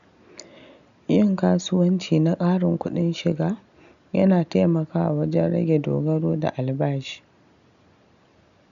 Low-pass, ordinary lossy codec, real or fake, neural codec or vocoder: 7.2 kHz; none; real; none